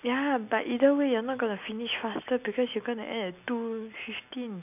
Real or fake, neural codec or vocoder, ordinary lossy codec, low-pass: real; none; none; 3.6 kHz